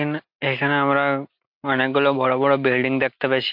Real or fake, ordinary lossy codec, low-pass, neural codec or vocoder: real; MP3, 48 kbps; 5.4 kHz; none